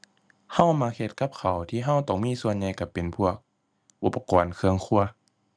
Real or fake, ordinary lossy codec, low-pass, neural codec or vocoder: real; none; none; none